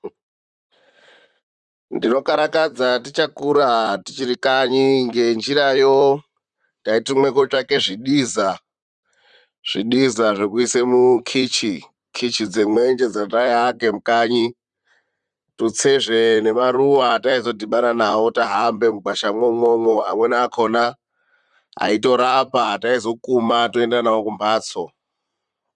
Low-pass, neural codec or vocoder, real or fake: 10.8 kHz; vocoder, 44.1 kHz, 128 mel bands, Pupu-Vocoder; fake